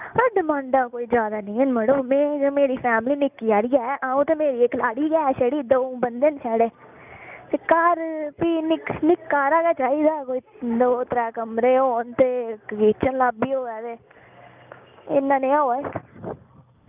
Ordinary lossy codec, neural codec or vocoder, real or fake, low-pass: none; none; real; 3.6 kHz